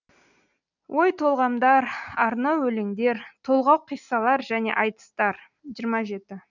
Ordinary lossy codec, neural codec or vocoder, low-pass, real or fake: none; none; 7.2 kHz; real